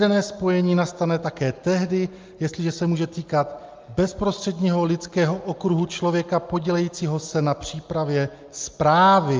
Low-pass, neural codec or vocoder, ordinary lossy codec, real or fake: 7.2 kHz; none; Opus, 24 kbps; real